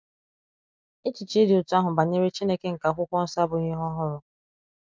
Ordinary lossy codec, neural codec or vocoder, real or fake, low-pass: none; none; real; none